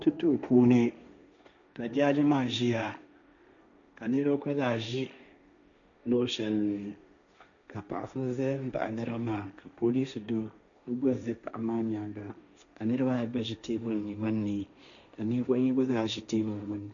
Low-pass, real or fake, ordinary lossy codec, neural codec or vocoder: 7.2 kHz; fake; MP3, 96 kbps; codec, 16 kHz, 1.1 kbps, Voila-Tokenizer